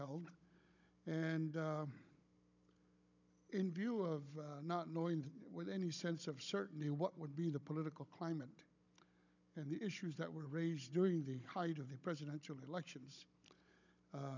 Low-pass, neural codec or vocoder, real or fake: 7.2 kHz; codec, 16 kHz, 16 kbps, FunCodec, trained on Chinese and English, 50 frames a second; fake